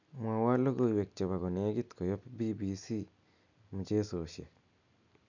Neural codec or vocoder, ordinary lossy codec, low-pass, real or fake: none; none; 7.2 kHz; real